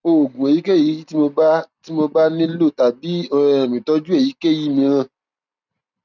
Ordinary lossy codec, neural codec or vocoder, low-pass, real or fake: none; none; 7.2 kHz; real